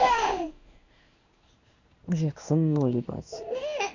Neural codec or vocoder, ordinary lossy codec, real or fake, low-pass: codec, 16 kHz in and 24 kHz out, 1 kbps, XY-Tokenizer; Opus, 64 kbps; fake; 7.2 kHz